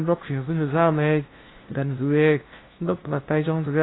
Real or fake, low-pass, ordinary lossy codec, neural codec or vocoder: fake; 7.2 kHz; AAC, 16 kbps; codec, 16 kHz, 0.5 kbps, FunCodec, trained on LibriTTS, 25 frames a second